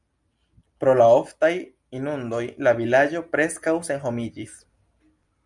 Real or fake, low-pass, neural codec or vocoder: real; 10.8 kHz; none